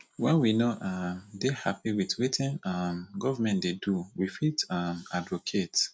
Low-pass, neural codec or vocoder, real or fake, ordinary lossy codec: none; none; real; none